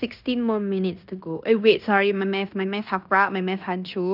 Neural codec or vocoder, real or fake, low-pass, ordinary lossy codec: codec, 16 kHz in and 24 kHz out, 0.9 kbps, LongCat-Audio-Codec, fine tuned four codebook decoder; fake; 5.4 kHz; none